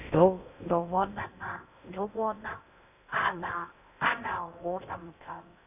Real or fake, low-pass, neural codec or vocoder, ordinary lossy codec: fake; 3.6 kHz; codec, 16 kHz in and 24 kHz out, 0.6 kbps, FocalCodec, streaming, 4096 codes; none